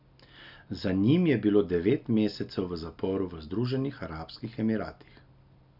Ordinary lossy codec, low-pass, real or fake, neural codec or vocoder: none; 5.4 kHz; real; none